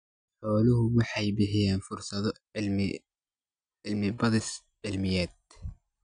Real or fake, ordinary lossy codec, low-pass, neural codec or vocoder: real; none; 9.9 kHz; none